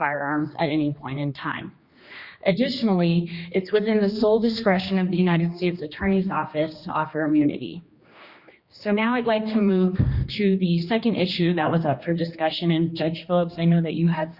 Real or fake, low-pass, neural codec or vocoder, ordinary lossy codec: fake; 5.4 kHz; codec, 16 kHz, 2 kbps, X-Codec, HuBERT features, trained on general audio; Opus, 64 kbps